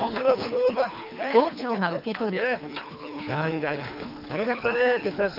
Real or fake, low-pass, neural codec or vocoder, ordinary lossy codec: fake; 5.4 kHz; codec, 24 kHz, 3 kbps, HILCodec; none